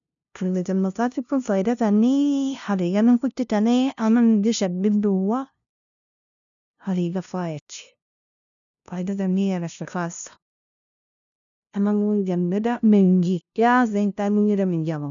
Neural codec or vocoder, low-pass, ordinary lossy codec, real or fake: codec, 16 kHz, 0.5 kbps, FunCodec, trained on LibriTTS, 25 frames a second; 7.2 kHz; none; fake